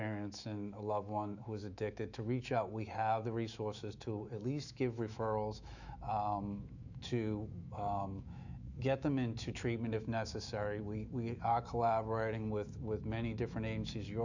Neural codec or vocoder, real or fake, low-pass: autoencoder, 48 kHz, 128 numbers a frame, DAC-VAE, trained on Japanese speech; fake; 7.2 kHz